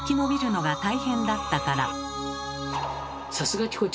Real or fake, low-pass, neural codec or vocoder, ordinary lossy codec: real; none; none; none